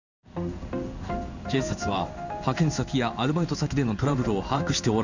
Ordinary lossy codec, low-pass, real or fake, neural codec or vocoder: none; 7.2 kHz; fake; codec, 16 kHz in and 24 kHz out, 1 kbps, XY-Tokenizer